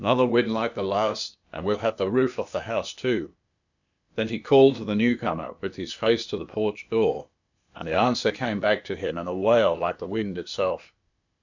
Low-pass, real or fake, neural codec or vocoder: 7.2 kHz; fake; codec, 16 kHz, 0.8 kbps, ZipCodec